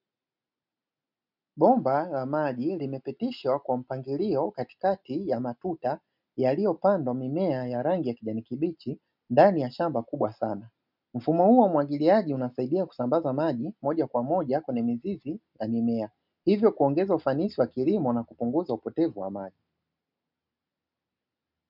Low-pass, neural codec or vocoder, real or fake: 5.4 kHz; none; real